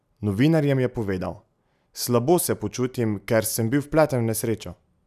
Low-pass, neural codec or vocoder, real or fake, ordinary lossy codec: 14.4 kHz; none; real; none